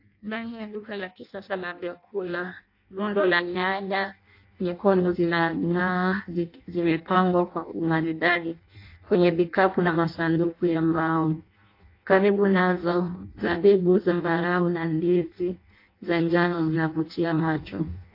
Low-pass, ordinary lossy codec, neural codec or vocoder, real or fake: 5.4 kHz; AAC, 32 kbps; codec, 16 kHz in and 24 kHz out, 0.6 kbps, FireRedTTS-2 codec; fake